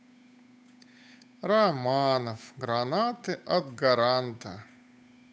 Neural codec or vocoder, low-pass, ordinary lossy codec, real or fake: codec, 16 kHz, 8 kbps, FunCodec, trained on Chinese and English, 25 frames a second; none; none; fake